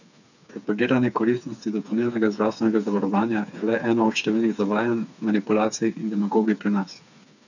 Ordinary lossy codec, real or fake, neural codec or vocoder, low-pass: none; fake; codec, 16 kHz, 4 kbps, FreqCodec, smaller model; 7.2 kHz